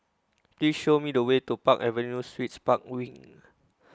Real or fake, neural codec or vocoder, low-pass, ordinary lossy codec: real; none; none; none